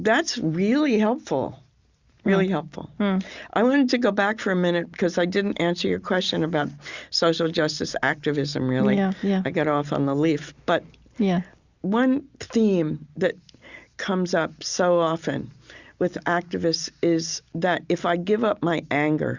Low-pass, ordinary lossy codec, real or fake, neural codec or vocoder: 7.2 kHz; Opus, 64 kbps; real; none